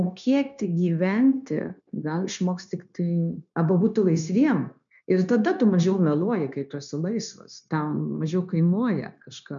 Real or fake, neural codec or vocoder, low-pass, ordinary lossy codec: fake; codec, 16 kHz, 0.9 kbps, LongCat-Audio-Codec; 7.2 kHz; MP3, 96 kbps